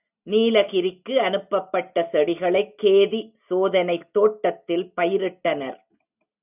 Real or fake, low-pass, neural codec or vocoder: real; 3.6 kHz; none